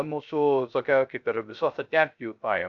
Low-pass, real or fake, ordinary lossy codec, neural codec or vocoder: 7.2 kHz; fake; AAC, 64 kbps; codec, 16 kHz, 0.3 kbps, FocalCodec